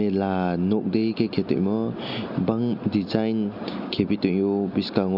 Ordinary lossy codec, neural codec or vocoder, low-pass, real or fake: none; none; 5.4 kHz; real